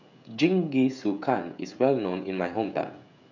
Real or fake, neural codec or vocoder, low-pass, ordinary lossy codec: fake; codec, 16 kHz, 16 kbps, FreqCodec, smaller model; 7.2 kHz; none